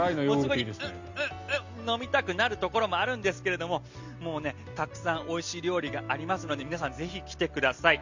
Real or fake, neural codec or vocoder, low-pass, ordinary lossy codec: real; none; 7.2 kHz; Opus, 64 kbps